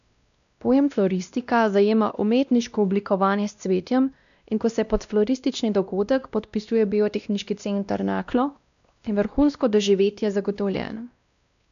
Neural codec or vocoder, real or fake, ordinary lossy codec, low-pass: codec, 16 kHz, 1 kbps, X-Codec, WavLM features, trained on Multilingual LibriSpeech; fake; none; 7.2 kHz